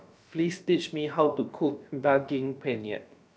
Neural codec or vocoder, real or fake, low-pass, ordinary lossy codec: codec, 16 kHz, about 1 kbps, DyCAST, with the encoder's durations; fake; none; none